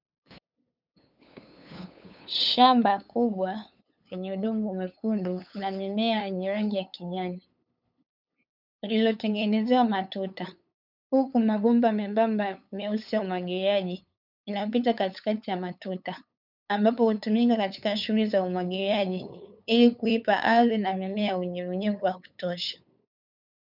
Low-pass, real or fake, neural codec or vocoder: 5.4 kHz; fake; codec, 16 kHz, 8 kbps, FunCodec, trained on LibriTTS, 25 frames a second